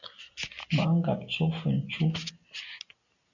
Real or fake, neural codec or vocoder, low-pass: real; none; 7.2 kHz